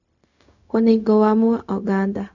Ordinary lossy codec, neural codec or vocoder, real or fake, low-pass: none; codec, 16 kHz, 0.4 kbps, LongCat-Audio-Codec; fake; 7.2 kHz